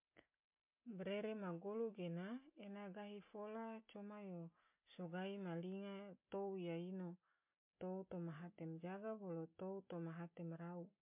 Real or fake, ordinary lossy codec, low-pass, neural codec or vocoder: real; MP3, 32 kbps; 3.6 kHz; none